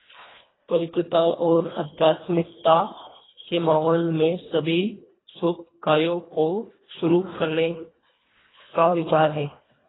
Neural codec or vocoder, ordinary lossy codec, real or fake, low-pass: codec, 24 kHz, 1.5 kbps, HILCodec; AAC, 16 kbps; fake; 7.2 kHz